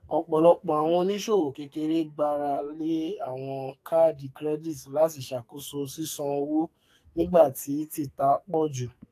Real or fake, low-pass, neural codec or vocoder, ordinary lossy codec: fake; 14.4 kHz; codec, 44.1 kHz, 2.6 kbps, SNAC; AAC, 64 kbps